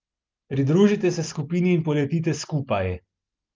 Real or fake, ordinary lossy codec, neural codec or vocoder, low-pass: real; Opus, 24 kbps; none; 7.2 kHz